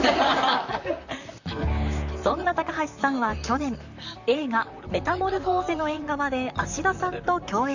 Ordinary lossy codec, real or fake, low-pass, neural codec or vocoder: none; fake; 7.2 kHz; vocoder, 44.1 kHz, 128 mel bands, Pupu-Vocoder